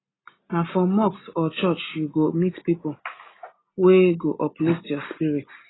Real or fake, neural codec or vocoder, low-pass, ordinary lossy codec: real; none; 7.2 kHz; AAC, 16 kbps